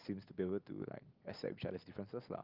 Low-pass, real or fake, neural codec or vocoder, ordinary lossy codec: 5.4 kHz; real; none; Opus, 32 kbps